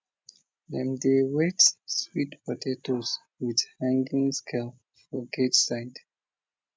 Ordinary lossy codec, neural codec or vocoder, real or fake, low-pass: none; none; real; none